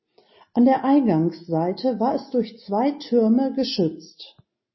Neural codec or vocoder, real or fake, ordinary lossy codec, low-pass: none; real; MP3, 24 kbps; 7.2 kHz